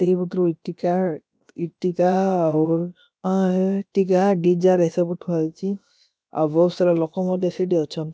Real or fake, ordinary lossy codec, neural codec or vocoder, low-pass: fake; none; codec, 16 kHz, about 1 kbps, DyCAST, with the encoder's durations; none